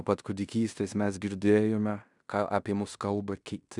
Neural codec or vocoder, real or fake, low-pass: codec, 16 kHz in and 24 kHz out, 0.9 kbps, LongCat-Audio-Codec, fine tuned four codebook decoder; fake; 10.8 kHz